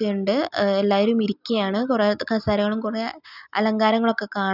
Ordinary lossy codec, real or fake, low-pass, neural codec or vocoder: none; real; 5.4 kHz; none